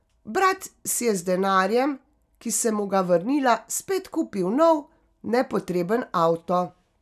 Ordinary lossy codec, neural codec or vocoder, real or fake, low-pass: none; none; real; 14.4 kHz